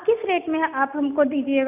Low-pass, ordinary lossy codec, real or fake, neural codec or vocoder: 3.6 kHz; none; real; none